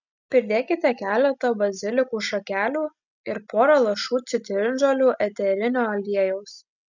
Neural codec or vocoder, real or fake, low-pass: none; real; 7.2 kHz